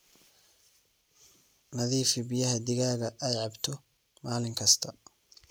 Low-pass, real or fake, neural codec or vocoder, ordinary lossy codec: none; real; none; none